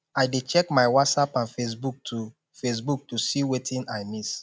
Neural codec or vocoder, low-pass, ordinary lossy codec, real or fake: none; none; none; real